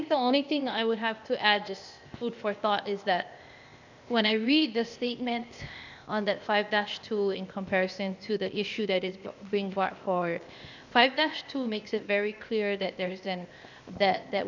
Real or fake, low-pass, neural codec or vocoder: fake; 7.2 kHz; codec, 16 kHz, 0.8 kbps, ZipCodec